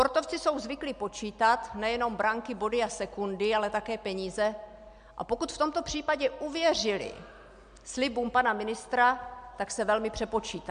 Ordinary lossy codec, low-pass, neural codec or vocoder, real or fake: MP3, 64 kbps; 9.9 kHz; none; real